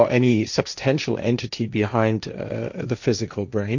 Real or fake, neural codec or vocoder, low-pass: fake; codec, 16 kHz, 1.1 kbps, Voila-Tokenizer; 7.2 kHz